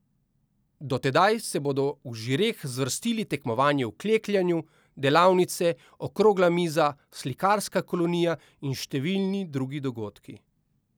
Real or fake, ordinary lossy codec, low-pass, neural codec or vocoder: real; none; none; none